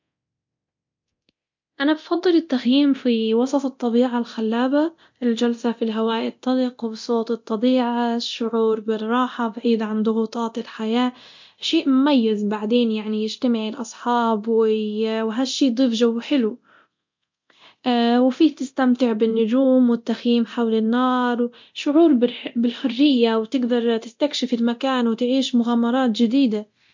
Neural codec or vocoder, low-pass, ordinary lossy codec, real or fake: codec, 24 kHz, 0.9 kbps, DualCodec; 7.2 kHz; MP3, 48 kbps; fake